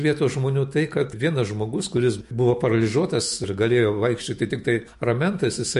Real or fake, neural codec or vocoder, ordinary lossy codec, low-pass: fake; autoencoder, 48 kHz, 128 numbers a frame, DAC-VAE, trained on Japanese speech; MP3, 48 kbps; 14.4 kHz